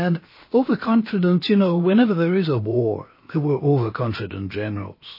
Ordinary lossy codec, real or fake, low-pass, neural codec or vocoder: MP3, 24 kbps; fake; 5.4 kHz; codec, 16 kHz, about 1 kbps, DyCAST, with the encoder's durations